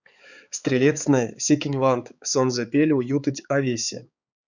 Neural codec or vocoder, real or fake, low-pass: codec, 16 kHz, 4 kbps, X-Codec, HuBERT features, trained on balanced general audio; fake; 7.2 kHz